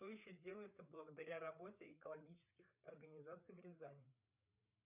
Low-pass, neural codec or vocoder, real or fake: 3.6 kHz; codec, 16 kHz, 4 kbps, FreqCodec, larger model; fake